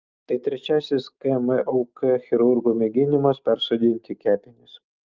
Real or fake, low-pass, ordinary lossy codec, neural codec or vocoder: real; 7.2 kHz; Opus, 32 kbps; none